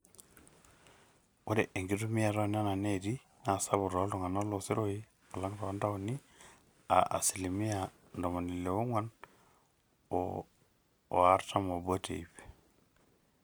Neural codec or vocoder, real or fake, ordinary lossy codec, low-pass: none; real; none; none